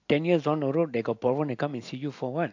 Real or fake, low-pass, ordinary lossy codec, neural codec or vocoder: real; 7.2 kHz; AAC, 48 kbps; none